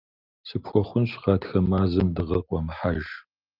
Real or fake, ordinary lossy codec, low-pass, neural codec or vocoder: real; Opus, 32 kbps; 5.4 kHz; none